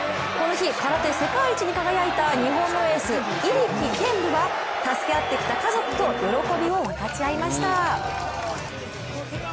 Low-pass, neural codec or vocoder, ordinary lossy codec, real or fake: none; none; none; real